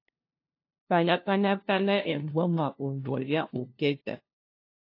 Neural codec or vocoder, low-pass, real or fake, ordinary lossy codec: codec, 16 kHz, 0.5 kbps, FunCodec, trained on LibriTTS, 25 frames a second; 5.4 kHz; fake; AAC, 32 kbps